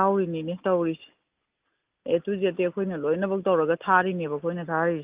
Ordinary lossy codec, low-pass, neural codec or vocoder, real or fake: Opus, 24 kbps; 3.6 kHz; none; real